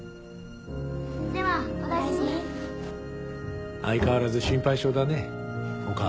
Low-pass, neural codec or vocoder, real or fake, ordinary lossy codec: none; none; real; none